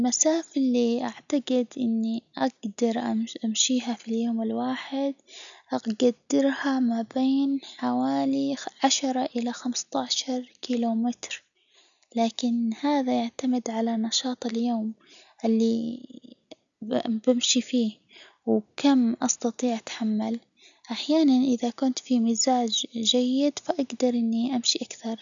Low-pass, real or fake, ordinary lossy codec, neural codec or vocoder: 7.2 kHz; real; none; none